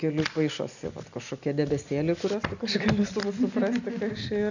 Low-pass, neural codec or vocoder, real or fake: 7.2 kHz; none; real